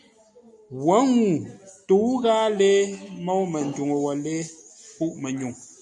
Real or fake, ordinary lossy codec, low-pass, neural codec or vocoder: real; AAC, 64 kbps; 10.8 kHz; none